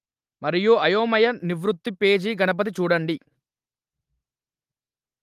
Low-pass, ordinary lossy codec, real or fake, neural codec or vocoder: 14.4 kHz; Opus, 24 kbps; real; none